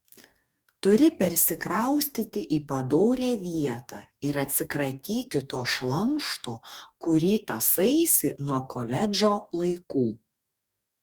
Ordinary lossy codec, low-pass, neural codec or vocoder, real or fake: Opus, 64 kbps; 19.8 kHz; codec, 44.1 kHz, 2.6 kbps, DAC; fake